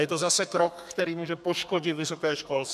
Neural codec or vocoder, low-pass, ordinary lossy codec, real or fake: codec, 32 kHz, 1.9 kbps, SNAC; 14.4 kHz; AAC, 96 kbps; fake